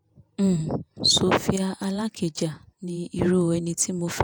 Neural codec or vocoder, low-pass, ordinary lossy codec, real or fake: vocoder, 48 kHz, 128 mel bands, Vocos; none; none; fake